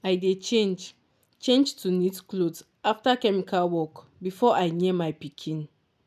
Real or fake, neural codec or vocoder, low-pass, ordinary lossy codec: real; none; 14.4 kHz; none